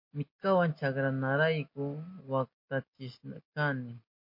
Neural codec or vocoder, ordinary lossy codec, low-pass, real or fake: none; MP3, 32 kbps; 5.4 kHz; real